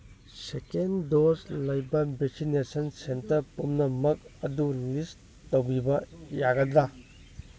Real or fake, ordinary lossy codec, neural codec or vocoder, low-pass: real; none; none; none